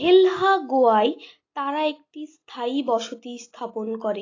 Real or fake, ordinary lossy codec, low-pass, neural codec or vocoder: real; AAC, 32 kbps; 7.2 kHz; none